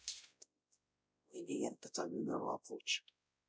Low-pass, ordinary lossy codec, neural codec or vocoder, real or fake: none; none; codec, 16 kHz, 0.5 kbps, X-Codec, WavLM features, trained on Multilingual LibriSpeech; fake